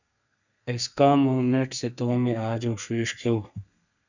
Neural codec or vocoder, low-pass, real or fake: codec, 32 kHz, 1.9 kbps, SNAC; 7.2 kHz; fake